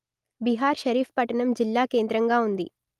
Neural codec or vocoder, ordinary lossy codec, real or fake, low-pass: none; Opus, 32 kbps; real; 14.4 kHz